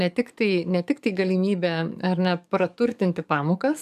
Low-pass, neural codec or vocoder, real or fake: 14.4 kHz; codec, 44.1 kHz, 7.8 kbps, DAC; fake